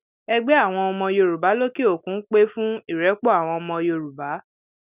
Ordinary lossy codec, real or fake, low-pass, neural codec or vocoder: none; real; 3.6 kHz; none